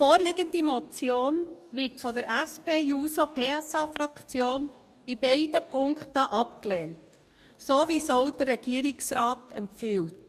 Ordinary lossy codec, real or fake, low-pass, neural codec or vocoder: MP3, 96 kbps; fake; 14.4 kHz; codec, 44.1 kHz, 2.6 kbps, DAC